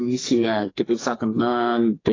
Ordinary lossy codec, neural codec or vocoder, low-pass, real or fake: AAC, 32 kbps; codec, 44.1 kHz, 2.6 kbps, SNAC; 7.2 kHz; fake